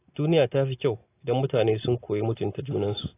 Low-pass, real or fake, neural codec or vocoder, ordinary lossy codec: 3.6 kHz; real; none; AAC, 16 kbps